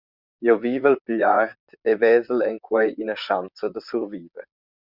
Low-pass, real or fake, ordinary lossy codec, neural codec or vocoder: 5.4 kHz; fake; Opus, 64 kbps; vocoder, 44.1 kHz, 128 mel bands, Pupu-Vocoder